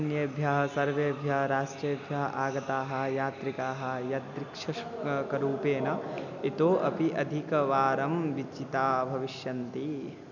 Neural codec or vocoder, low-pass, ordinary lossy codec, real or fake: none; 7.2 kHz; none; real